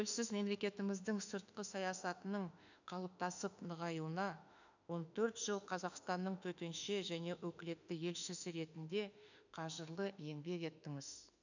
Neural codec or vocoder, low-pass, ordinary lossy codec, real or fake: autoencoder, 48 kHz, 32 numbers a frame, DAC-VAE, trained on Japanese speech; 7.2 kHz; none; fake